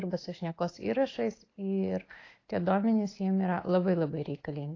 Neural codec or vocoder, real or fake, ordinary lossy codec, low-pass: none; real; AAC, 32 kbps; 7.2 kHz